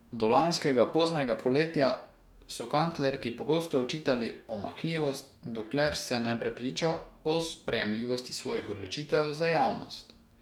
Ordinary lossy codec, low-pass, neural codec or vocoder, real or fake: none; 19.8 kHz; codec, 44.1 kHz, 2.6 kbps, DAC; fake